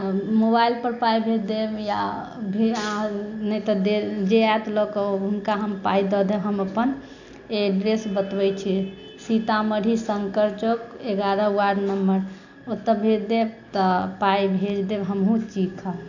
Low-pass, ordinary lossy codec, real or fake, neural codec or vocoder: 7.2 kHz; none; real; none